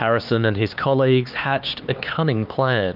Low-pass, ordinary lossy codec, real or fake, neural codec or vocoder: 5.4 kHz; Opus, 24 kbps; fake; codec, 16 kHz, 4 kbps, X-Codec, HuBERT features, trained on LibriSpeech